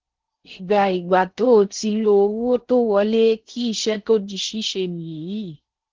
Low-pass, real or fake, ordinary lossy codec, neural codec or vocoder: 7.2 kHz; fake; Opus, 16 kbps; codec, 16 kHz in and 24 kHz out, 0.6 kbps, FocalCodec, streaming, 4096 codes